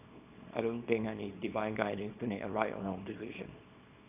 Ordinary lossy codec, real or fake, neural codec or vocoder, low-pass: none; fake; codec, 24 kHz, 0.9 kbps, WavTokenizer, small release; 3.6 kHz